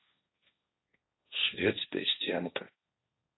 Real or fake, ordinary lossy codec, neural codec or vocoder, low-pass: fake; AAC, 16 kbps; codec, 16 kHz, 1.1 kbps, Voila-Tokenizer; 7.2 kHz